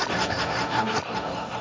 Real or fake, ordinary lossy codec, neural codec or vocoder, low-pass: fake; none; codec, 16 kHz, 1.1 kbps, Voila-Tokenizer; none